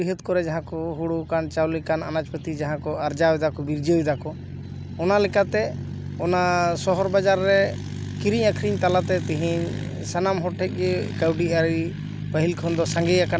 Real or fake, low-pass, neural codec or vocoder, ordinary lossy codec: real; none; none; none